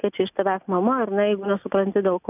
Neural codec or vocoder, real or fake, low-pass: none; real; 3.6 kHz